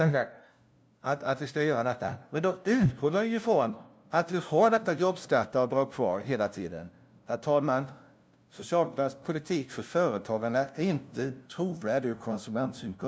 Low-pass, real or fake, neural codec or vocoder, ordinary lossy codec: none; fake; codec, 16 kHz, 0.5 kbps, FunCodec, trained on LibriTTS, 25 frames a second; none